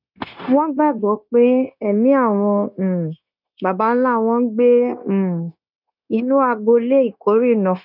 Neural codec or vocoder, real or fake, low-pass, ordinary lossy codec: codec, 16 kHz, 0.9 kbps, LongCat-Audio-Codec; fake; 5.4 kHz; none